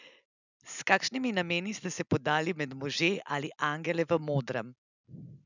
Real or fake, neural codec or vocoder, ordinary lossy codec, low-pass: real; none; none; 7.2 kHz